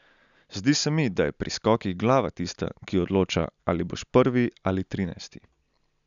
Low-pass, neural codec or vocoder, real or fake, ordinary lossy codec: 7.2 kHz; none; real; none